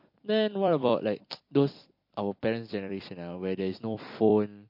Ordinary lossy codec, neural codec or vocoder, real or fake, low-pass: MP3, 32 kbps; vocoder, 44.1 kHz, 128 mel bands every 256 samples, BigVGAN v2; fake; 5.4 kHz